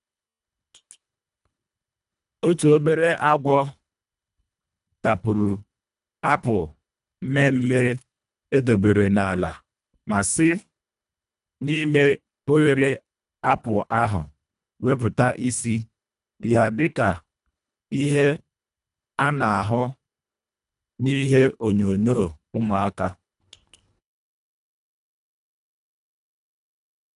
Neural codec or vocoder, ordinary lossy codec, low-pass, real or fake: codec, 24 kHz, 1.5 kbps, HILCodec; none; 10.8 kHz; fake